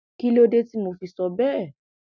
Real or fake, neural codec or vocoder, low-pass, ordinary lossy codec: real; none; 7.2 kHz; none